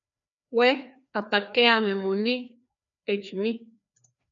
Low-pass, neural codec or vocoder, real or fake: 7.2 kHz; codec, 16 kHz, 2 kbps, FreqCodec, larger model; fake